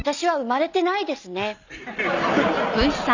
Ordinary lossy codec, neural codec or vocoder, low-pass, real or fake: none; none; 7.2 kHz; real